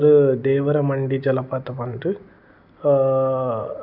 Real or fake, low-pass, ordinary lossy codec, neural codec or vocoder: real; 5.4 kHz; none; none